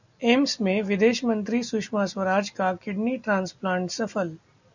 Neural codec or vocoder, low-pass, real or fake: none; 7.2 kHz; real